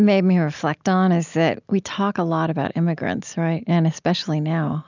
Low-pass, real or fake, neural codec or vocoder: 7.2 kHz; real; none